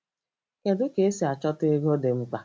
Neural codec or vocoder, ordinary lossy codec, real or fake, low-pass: none; none; real; none